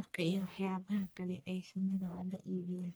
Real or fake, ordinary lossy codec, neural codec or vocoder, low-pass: fake; none; codec, 44.1 kHz, 1.7 kbps, Pupu-Codec; none